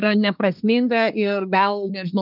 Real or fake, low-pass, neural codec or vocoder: fake; 5.4 kHz; codec, 24 kHz, 1 kbps, SNAC